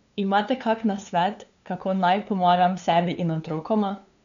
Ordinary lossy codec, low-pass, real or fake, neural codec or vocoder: none; 7.2 kHz; fake; codec, 16 kHz, 2 kbps, FunCodec, trained on LibriTTS, 25 frames a second